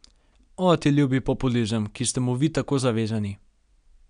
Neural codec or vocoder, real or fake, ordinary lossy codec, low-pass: none; real; none; 9.9 kHz